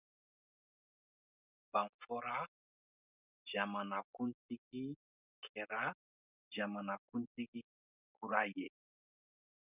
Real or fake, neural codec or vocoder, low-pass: real; none; 3.6 kHz